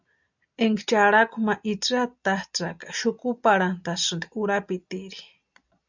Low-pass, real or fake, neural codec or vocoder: 7.2 kHz; real; none